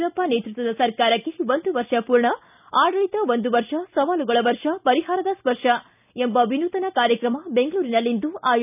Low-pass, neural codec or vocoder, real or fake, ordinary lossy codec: 3.6 kHz; none; real; none